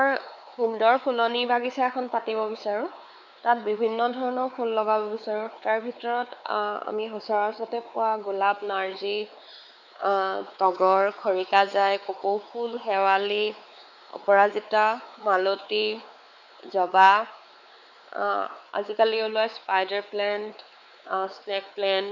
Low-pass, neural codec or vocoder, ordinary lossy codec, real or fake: 7.2 kHz; codec, 16 kHz, 4 kbps, X-Codec, WavLM features, trained on Multilingual LibriSpeech; none; fake